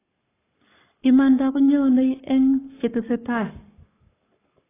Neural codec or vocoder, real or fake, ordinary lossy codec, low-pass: codec, 44.1 kHz, 7.8 kbps, Pupu-Codec; fake; AAC, 16 kbps; 3.6 kHz